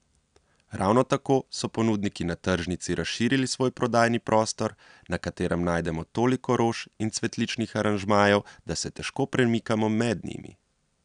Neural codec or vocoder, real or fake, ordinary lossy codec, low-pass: none; real; none; 9.9 kHz